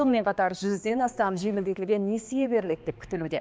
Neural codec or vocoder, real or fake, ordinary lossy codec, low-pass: codec, 16 kHz, 2 kbps, X-Codec, HuBERT features, trained on balanced general audio; fake; none; none